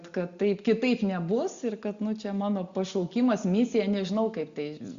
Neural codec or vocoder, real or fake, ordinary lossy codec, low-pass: none; real; Opus, 64 kbps; 7.2 kHz